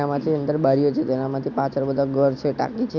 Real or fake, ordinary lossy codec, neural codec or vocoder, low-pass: real; Opus, 64 kbps; none; 7.2 kHz